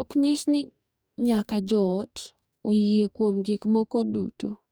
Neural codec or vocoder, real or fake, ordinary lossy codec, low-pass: codec, 44.1 kHz, 2.6 kbps, DAC; fake; none; none